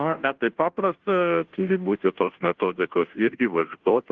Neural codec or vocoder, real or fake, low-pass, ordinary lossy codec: codec, 16 kHz, 0.5 kbps, FunCodec, trained on Chinese and English, 25 frames a second; fake; 7.2 kHz; Opus, 16 kbps